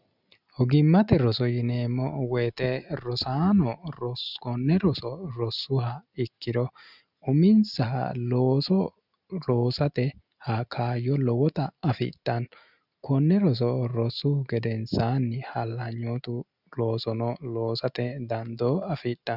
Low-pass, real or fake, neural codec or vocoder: 5.4 kHz; real; none